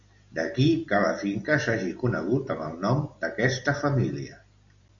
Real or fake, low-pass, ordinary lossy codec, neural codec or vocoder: real; 7.2 kHz; MP3, 64 kbps; none